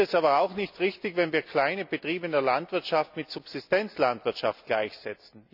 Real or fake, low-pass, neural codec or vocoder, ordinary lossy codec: real; 5.4 kHz; none; none